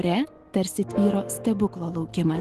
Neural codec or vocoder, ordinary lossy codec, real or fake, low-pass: autoencoder, 48 kHz, 128 numbers a frame, DAC-VAE, trained on Japanese speech; Opus, 16 kbps; fake; 14.4 kHz